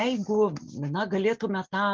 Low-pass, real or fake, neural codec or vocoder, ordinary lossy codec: 7.2 kHz; real; none; Opus, 32 kbps